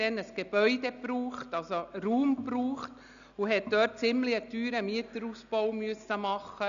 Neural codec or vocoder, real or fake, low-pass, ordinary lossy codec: none; real; 7.2 kHz; none